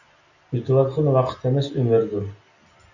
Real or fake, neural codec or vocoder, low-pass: real; none; 7.2 kHz